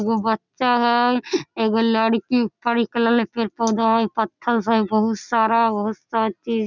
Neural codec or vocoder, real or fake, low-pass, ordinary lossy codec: none; real; 7.2 kHz; none